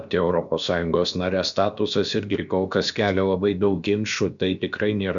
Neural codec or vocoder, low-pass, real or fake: codec, 16 kHz, about 1 kbps, DyCAST, with the encoder's durations; 7.2 kHz; fake